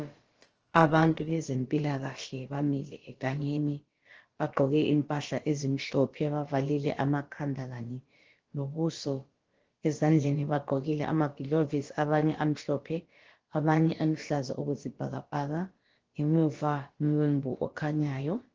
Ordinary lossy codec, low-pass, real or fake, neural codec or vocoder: Opus, 16 kbps; 7.2 kHz; fake; codec, 16 kHz, about 1 kbps, DyCAST, with the encoder's durations